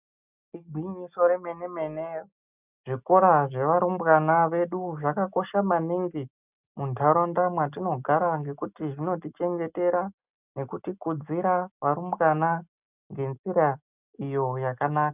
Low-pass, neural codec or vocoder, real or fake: 3.6 kHz; none; real